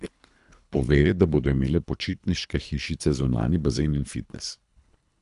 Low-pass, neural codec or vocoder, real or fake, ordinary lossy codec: 10.8 kHz; codec, 24 kHz, 3 kbps, HILCodec; fake; none